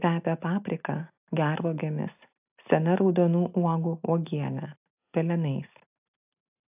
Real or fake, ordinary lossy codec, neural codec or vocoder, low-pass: real; AAC, 32 kbps; none; 3.6 kHz